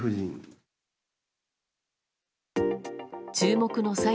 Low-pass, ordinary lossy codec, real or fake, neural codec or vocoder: none; none; real; none